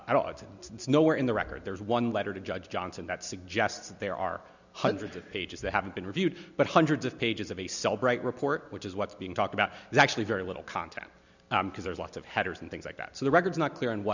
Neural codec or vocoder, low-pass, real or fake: none; 7.2 kHz; real